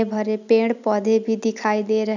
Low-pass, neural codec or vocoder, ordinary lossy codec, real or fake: 7.2 kHz; none; none; real